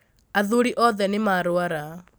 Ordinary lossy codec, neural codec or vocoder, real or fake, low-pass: none; none; real; none